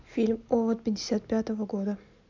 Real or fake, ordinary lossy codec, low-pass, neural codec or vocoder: real; MP3, 64 kbps; 7.2 kHz; none